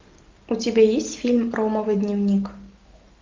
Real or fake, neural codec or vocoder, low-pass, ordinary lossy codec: real; none; 7.2 kHz; Opus, 24 kbps